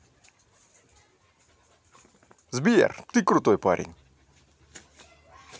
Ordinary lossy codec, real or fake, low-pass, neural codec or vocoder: none; real; none; none